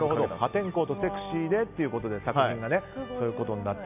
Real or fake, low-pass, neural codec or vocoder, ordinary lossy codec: real; 3.6 kHz; none; none